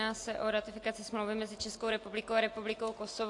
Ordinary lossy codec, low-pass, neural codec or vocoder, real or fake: AAC, 48 kbps; 10.8 kHz; none; real